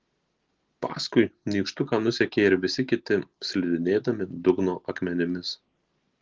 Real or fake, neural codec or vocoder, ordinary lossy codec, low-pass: real; none; Opus, 16 kbps; 7.2 kHz